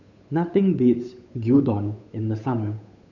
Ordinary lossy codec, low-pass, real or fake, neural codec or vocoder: none; 7.2 kHz; fake; codec, 16 kHz, 8 kbps, FunCodec, trained on Chinese and English, 25 frames a second